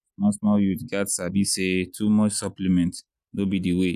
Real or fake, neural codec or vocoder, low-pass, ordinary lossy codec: real; none; 14.4 kHz; none